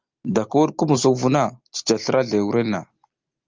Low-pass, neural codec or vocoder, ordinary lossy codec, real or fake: 7.2 kHz; none; Opus, 24 kbps; real